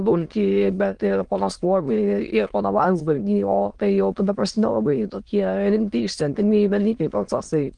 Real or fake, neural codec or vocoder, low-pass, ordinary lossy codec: fake; autoencoder, 22.05 kHz, a latent of 192 numbers a frame, VITS, trained on many speakers; 9.9 kHz; Opus, 24 kbps